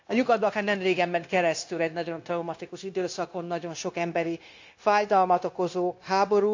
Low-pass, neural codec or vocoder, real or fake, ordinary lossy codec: 7.2 kHz; codec, 16 kHz, 0.9 kbps, LongCat-Audio-Codec; fake; AAC, 48 kbps